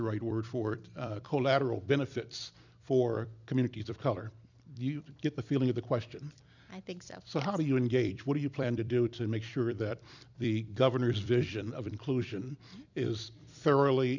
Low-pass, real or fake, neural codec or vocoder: 7.2 kHz; fake; vocoder, 44.1 kHz, 128 mel bands every 256 samples, BigVGAN v2